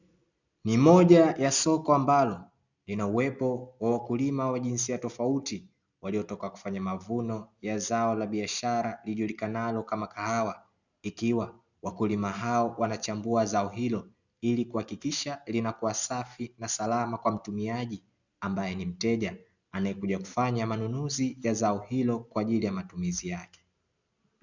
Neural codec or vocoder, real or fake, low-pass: none; real; 7.2 kHz